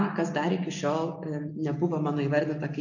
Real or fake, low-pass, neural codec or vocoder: real; 7.2 kHz; none